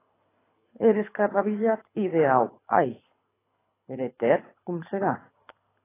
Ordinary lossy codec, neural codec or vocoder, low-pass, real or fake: AAC, 16 kbps; codec, 24 kHz, 6 kbps, HILCodec; 3.6 kHz; fake